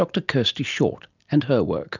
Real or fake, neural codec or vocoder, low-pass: real; none; 7.2 kHz